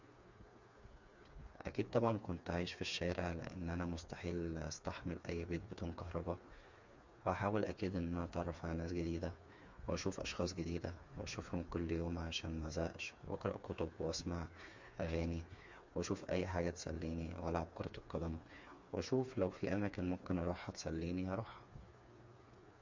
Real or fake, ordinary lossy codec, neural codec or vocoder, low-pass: fake; MP3, 48 kbps; codec, 16 kHz, 4 kbps, FreqCodec, smaller model; 7.2 kHz